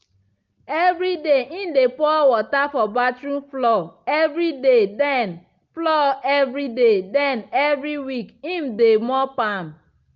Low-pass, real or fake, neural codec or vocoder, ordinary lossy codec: 7.2 kHz; real; none; Opus, 24 kbps